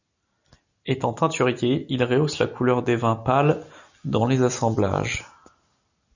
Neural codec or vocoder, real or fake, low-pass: none; real; 7.2 kHz